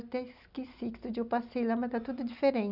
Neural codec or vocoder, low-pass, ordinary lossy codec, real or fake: none; 5.4 kHz; none; real